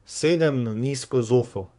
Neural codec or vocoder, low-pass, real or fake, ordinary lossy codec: codec, 24 kHz, 1 kbps, SNAC; 10.8 kHz; fake; none